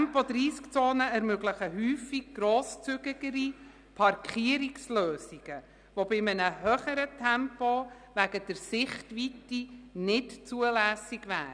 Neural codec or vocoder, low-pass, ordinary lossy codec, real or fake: none; 9.9 kHz; none; real